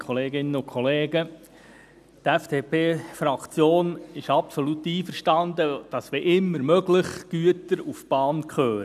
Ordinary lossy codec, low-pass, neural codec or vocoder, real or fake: none; 14.4 kHz; none; real